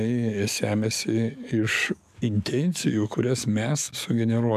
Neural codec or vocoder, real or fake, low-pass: codec, 44.1 kHz, 7.8 kbps, DAC; fake; 14.4 kHz